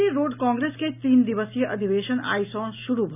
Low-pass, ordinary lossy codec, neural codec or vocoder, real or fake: 3.6 kHz; none; none; real